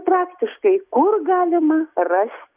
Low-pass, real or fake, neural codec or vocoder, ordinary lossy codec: 3.6 kHz; real; none; Opus, 64 kbps